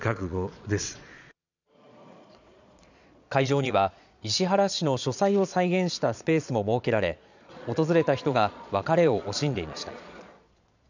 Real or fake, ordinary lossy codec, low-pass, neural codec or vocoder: fake; none; 7.2 kHz; vocoder, 22.05 kHz, 80 mel bands, Vocos